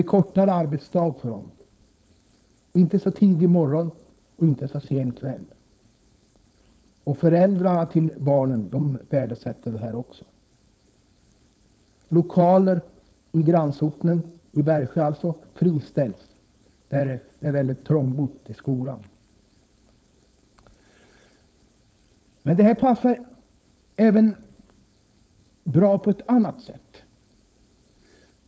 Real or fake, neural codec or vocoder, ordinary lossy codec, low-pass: fake; codec, 16 kHz, 4.8 kbps, FACodec; none; none